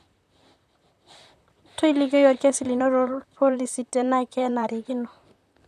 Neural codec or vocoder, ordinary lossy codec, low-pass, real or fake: vocoder, 44.1 kHz, 128 mel bands, Pupu-Vocoder; none; 14.4 kHz; fake